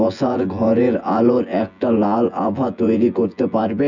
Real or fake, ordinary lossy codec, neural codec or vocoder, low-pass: fake; none; vocoder, 24 kHz, 100 mel bands, Vocos; 7.2 kHz